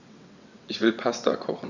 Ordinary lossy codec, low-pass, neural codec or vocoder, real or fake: none; 7.2 kHz; vocoder, 22.05 kHz, 80 mel bands, WaveNeXt; fake